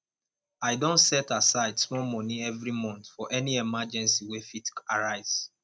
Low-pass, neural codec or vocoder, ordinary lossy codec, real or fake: none; none; none; real